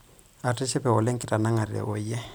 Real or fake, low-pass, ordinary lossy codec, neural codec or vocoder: fake; none; none; vocoder, 44.1 kHz, 128 mel bands every 256 samples, BigVGAN v2